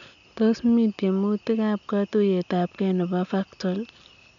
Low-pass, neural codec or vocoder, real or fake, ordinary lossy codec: 7.2 kHz; none; real; none